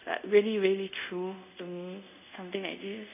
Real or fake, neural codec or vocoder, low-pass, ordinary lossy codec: fake; codec, 24 kHz, 0.5 kbps, DualCodec; 3.6 kHz; none